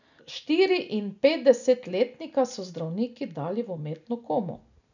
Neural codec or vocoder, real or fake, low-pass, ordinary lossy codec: none; real; 7.2 kHz; none